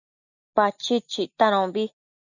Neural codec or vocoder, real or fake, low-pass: none; real; 7.2 kHz